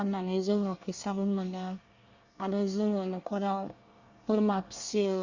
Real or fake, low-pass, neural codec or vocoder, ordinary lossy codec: fake; 7.2 kHz; codec, 24 kHz, 1 kbps, SNAC; Opus, 64 kbps